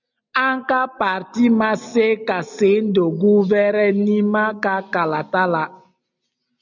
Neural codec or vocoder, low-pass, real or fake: none; 7.2 kHz; real